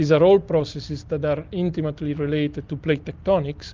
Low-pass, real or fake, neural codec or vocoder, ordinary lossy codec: 7.2 kHz; real; none; Opus, 32 kbps